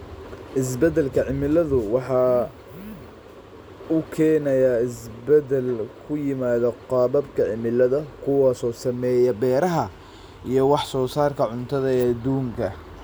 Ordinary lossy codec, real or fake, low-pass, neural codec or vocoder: none; real; none; none